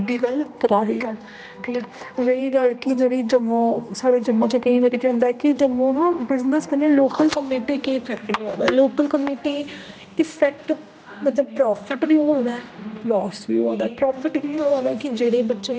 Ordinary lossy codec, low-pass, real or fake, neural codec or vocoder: none; none; fake; codec, 16 kHz, 1 kbps, X-Codec, HuBERT features, trained on general audio